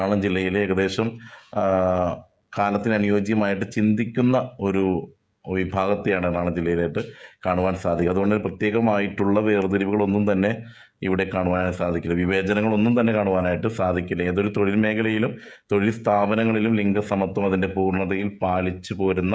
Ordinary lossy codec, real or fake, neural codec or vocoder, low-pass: none; fake; codec, 16 kHz, 16 kbps, FreqCodec, smaller model; none